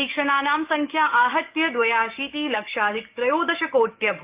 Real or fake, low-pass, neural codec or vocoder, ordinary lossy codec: fake; 3.6 kHz; codec, 44.1 kHz, 7.8 kbps, DAC; Opus, 32 kbps